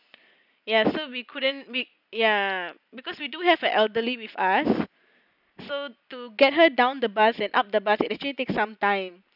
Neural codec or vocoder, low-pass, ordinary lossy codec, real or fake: none; 5.4 kHz; none; real